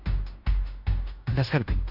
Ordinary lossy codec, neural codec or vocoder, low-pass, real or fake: none; codec, 16 kHz in and 24 kHz out, 0.9 kbps, LongCat-Audio-Codec, fine tuned four codebook decoder; 5.4 kHz; fake